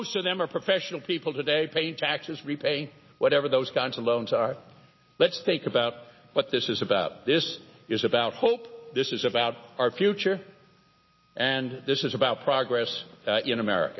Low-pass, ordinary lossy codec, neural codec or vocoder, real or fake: 7.2 kHz; MP3, 24 kbps; none; real